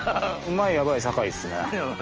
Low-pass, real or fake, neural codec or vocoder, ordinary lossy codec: 7.2 kHz; real; none; Opus, 24 kbps